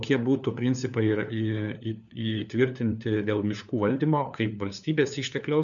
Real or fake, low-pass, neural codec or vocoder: fake; 7.2 kHz; codec, 16 kHz, 4 kbps, FunCodec, trained on LibriTTS, 50 frames a second